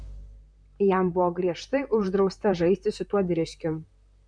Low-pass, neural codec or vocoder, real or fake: 9.9 kHz; vocoder, 44.1 kHz, 128 mel bands, Pupu-Vocoder; fake